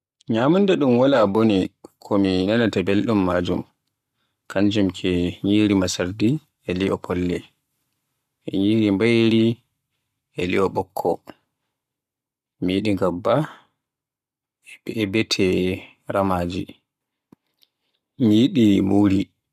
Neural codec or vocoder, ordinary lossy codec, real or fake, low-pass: codec, 44.1 kHz, 7.8 kbps, Pupu-Codec; none; fake; 14.4 kHz